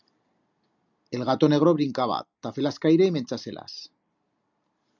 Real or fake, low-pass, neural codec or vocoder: real; 7.2 kHz; none